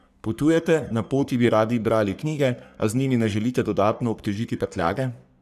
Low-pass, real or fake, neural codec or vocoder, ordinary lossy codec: 14.4 kHz; fake; codec, 44.1 kHz, 3.4 kbps, Pupu-Codec; none